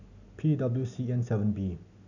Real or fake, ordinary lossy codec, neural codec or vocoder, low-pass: real; none; none; 7.2 kHz